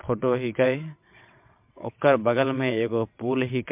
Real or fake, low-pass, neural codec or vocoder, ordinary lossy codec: fake; 3.6 kHz; vocoder, 22.05 kHz, 80 mel bands, WaveNeXt; MP3, 32 kbps